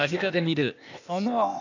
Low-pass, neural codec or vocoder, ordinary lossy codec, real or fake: 7.2 kHz; codec, 16 kHz, 0.8 kbps, ZipCodec; none; fake